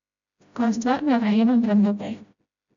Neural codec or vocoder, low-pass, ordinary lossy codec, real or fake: codec, 16 kHz, 0.5 kbps, FreqCodec, smaller model; 7.2 kHz; none; fake